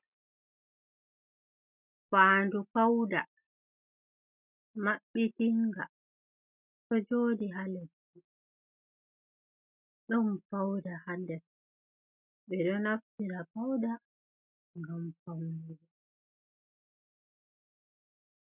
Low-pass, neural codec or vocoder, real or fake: 3.6 kHz; none; real